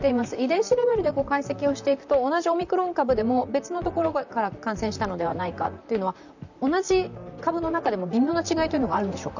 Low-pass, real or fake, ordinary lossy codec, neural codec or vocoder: 7.2 kHz; fake; none; vocoder, 44.1 kHz, 128 mel bands, Pupu-Vocoder